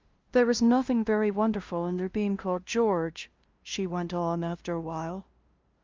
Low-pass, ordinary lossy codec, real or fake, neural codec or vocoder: 7.2 kHz; Opus, 32 kbps; fake; codec, 16 kHz, 0.5 kbps, FunCodec, trained on LibriTTS, 25 frames a second